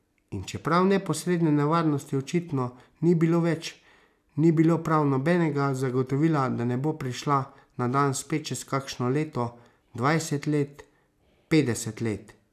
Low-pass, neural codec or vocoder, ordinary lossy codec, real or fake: 14.4 kHz; none; none; real